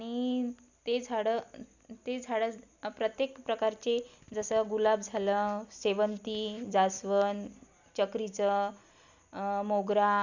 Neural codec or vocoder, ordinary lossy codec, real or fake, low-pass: none; none; real; 7.2 kHz